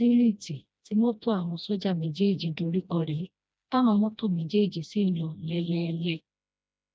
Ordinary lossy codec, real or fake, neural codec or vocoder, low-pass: none; fake; codec, 16 kHz, 1 kbps, FreqCodec, smaller model; none